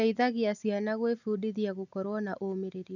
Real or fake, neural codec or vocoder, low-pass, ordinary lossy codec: real; none; 7.2 kHz; none